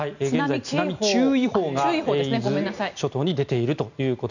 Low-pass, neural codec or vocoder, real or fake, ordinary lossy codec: 7.2 kHz; none; real; none